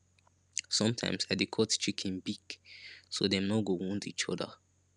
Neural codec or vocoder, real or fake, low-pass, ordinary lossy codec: none; real; 10.8 kHz; none